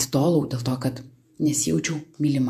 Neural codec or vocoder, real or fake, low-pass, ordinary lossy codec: vocoder, 48 kHz, 128 mel bands, Vocos; fake; 14.4 kHz; AAC, 96 kbps